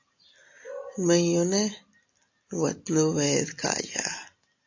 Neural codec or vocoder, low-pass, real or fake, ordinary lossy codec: none; 7.2 kHz; real; AAC, 48 kbps